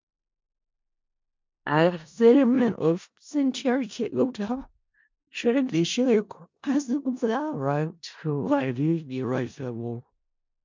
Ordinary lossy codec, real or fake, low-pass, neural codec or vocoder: MP3, 64 kbps; fake; 7.2 kHz; codec, 16 kHz in and 24 kHz out, 0.4 kbps, LongCat-Audio-Codec, four codebook decoder